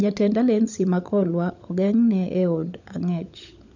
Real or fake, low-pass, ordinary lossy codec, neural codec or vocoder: fake; 7.2 kHz; none; codec, 16 kHz, 16 kbps, FunCodec, trained on LibriTTS, 50 frames a second